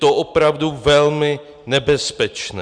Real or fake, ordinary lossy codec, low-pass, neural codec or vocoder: real; Opus, 64 kbps; 9.9 kHz; none